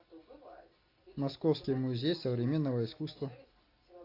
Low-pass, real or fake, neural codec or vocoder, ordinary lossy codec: 5.4 kHz; real; none; AAC, 32 kbps